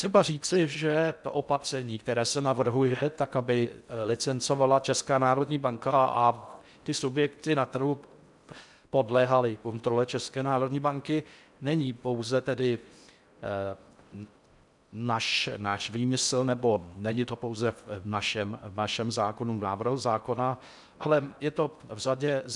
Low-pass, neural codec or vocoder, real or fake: 10.8 kHz; codec, 16 kHz in and 24 kHz out, 0.6 kbps, FocalCodec, streaming, 4096 codes; fake